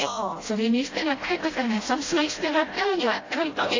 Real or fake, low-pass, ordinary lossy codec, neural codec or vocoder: fake; 7.2 kHz; AAC, 32 kbps; codec, 16 kHz, 0.5 kbps, FreqCodec, smaller model